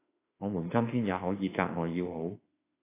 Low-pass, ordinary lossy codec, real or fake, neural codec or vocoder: 3.6 kHz; AAC, 24 kbps; fake; autoencoder, 48 kHz, 32 numbers a frame, DAC-VAE, trained on Japanese speech